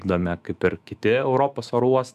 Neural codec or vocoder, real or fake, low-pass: autoencoder, 48 kHz, 128 numbers a frame, DAC-VAE, trained on Japanese speech; fake; 14.4 kHz